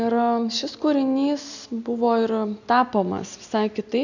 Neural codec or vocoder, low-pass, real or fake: none; 7.2 kHz; real